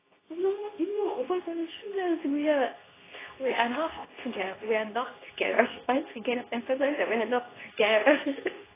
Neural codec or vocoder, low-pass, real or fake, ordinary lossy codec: codec, 24 kHz, 0.9 kbps, WavTokenizer, medium speech release version 2; 3.6 kHz; fake; AAC, 16 kbps